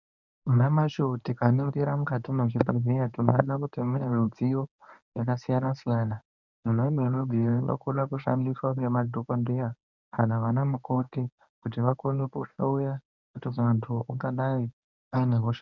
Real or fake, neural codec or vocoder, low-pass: fake; codec, 24 kHz, 0.9 kbps, WavTokenizer, medium speech release version 1; 7.2 kHz